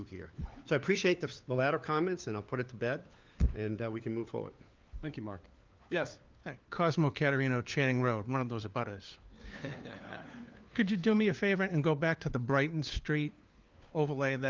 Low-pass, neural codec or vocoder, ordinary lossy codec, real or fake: 7.2 kHz; codec, 16 kHz, 4 kbps, FunCodec, trained on LibriTTS, 50 frames a second; Opus, 24 kbps; fake